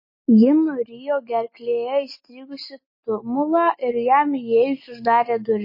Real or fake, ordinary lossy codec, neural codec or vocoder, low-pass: real; MP3, 24 kbps; none; 5.4 kHz